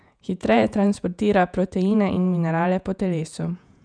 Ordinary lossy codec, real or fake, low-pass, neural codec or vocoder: none; fake; 9.9 kHz; vocoder, 48 kHz, 128 mel bands, Vocos